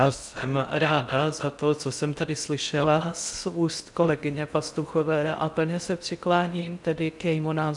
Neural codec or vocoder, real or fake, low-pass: codec, 16 kHz in and 24 kHz out, 0.6 kbps, FocalCodec, streaming, 4096 codes; fake; 10.8 kHz